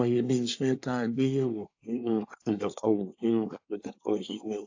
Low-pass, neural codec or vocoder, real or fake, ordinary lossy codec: 7.2 kHz; codec, 24 kHz, 1 kbps, SNAC; fake; AAC, 48 kbps